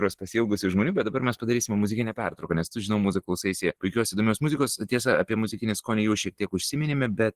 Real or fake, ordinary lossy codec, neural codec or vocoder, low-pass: real; Opus, 16 kbps; none; 14.4 kHz